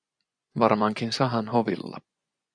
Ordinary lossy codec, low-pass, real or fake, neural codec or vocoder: AAC, 64 kbps; 9.9 kHz; fake; vocoder, 24 kHz, 100 mel bands, Vocos